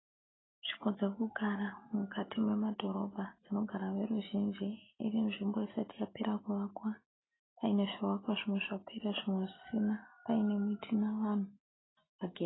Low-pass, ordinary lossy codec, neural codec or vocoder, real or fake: 7.2 kHz; AAC, 16 kbps; none; real